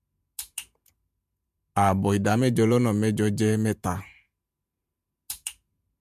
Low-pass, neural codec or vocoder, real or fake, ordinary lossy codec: 14.4 kHz; vocoder, 48 kHz, 128 mel bands, Vocos; fake; MP3, 96 kbps